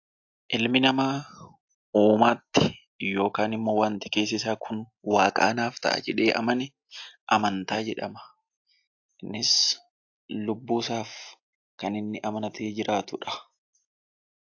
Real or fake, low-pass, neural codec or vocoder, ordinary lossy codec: real; 7.2 kHz; none; AAC, 48 kbps